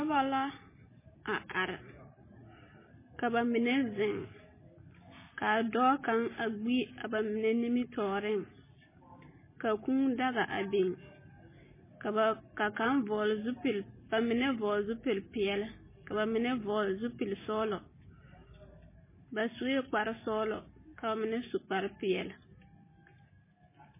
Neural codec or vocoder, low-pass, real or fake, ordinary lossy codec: none; 3.6 kHz; real; MP3, 16 kbps